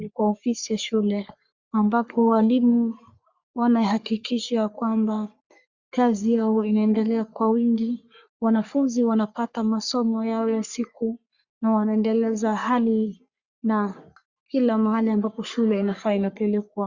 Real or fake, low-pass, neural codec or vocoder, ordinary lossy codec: fake; 7.2 kHz; codec, 44.1 kHz, 3.4 kbps, Pupu-Codec; Opus, 64 kbps